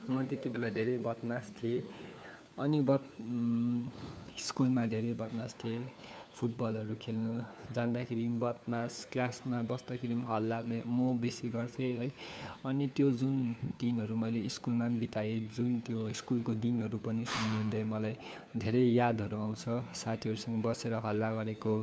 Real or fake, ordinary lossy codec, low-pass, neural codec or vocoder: fake; none; none; codec, 16 kHz, 4 kbps, FunCodec, trained on LibriTTS, 50 frames a second